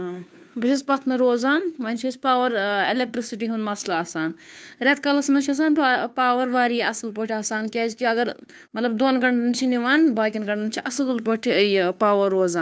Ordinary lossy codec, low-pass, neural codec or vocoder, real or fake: none; none; codec, 16 kHz, 2 kbps, FunCodec, trained on Chinese and English, 25 frames a second; fake